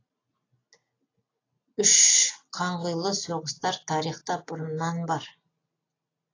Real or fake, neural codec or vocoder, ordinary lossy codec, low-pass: real; none; AAC, 32 kbps; 7.2 kHz